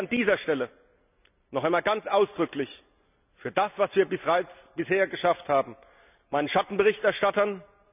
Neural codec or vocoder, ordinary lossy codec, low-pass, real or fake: none; none; 3.6 kHz; real